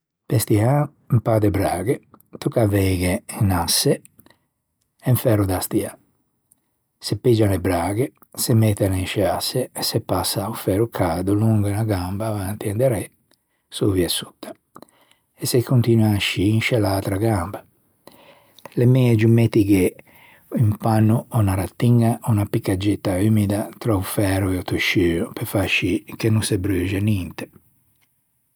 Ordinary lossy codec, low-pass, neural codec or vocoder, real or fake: none; none; none; real